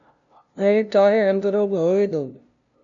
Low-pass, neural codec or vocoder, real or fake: 7.2 kHz; codec, 16 kHz, 0.5 kbps, FunCodec, trained on LibriTTS, 25 frames a second; fake